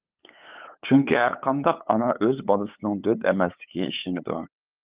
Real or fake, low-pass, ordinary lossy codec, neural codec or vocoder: fake; 3.6 kHz; Opus, 32 kbps; codec, 16 kHz, 8 kbps, FunCodec, trained on LibriTTS, 25 frames a second